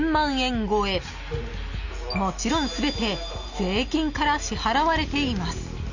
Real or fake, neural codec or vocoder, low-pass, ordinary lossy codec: real; none; 7.2 kHz; none